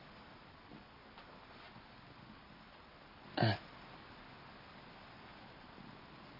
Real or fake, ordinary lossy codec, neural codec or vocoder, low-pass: real; none; none; 5.4 kHz